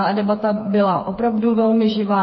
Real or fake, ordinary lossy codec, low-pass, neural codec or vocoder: fake; MP3, 24 kbps; 7.2 kHz; codec, 16 kHz, 4 kbps, FreqCodec, smaller model